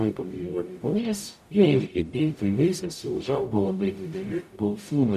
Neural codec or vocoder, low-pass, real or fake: codec, 44.1 kHz, 0.9 kbps, DAC; 14.4 kHz; fake